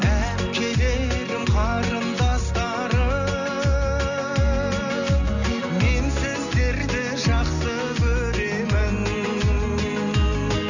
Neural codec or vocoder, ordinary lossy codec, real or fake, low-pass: none; none; real; 7.2 kHz